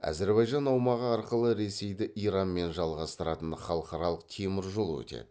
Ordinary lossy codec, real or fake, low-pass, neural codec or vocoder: none; real; none; none